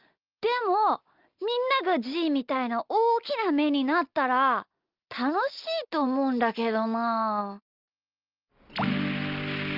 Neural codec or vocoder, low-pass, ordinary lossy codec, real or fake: none; 5.4 kHz; Opus, 32 kbps; real